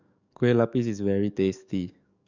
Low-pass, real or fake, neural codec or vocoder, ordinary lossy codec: 7.2 kHz; fake; codec, 44.1 kHz, 7.8 kbps, DAC; none